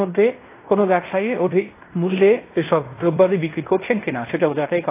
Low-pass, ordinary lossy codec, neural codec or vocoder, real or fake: 3.6 kHz; AAC, 24 kbps; codec, 16 kHz in and 24 kHz out, 0.9 kbps, LongCat-Audio-Codec, fine tuned four codebook decoder; fake